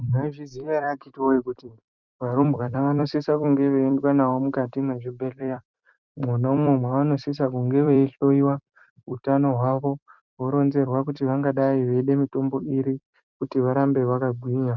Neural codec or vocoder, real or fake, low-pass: vocoder, 44.1 kHz, 128 mel bands every 256 samples, BigVGAN v2; fake; 7.2 kHz